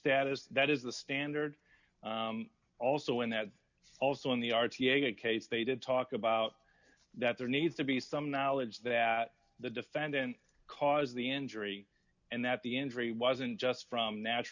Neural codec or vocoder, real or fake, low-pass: none; real; 7.2 kHz